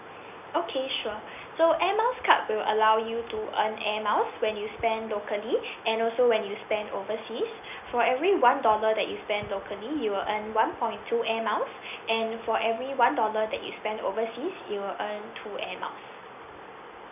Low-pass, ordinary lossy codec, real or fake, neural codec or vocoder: 3.6 kHz; none; real; none